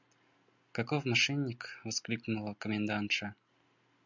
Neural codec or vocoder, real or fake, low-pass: none; real; 7.2 kHz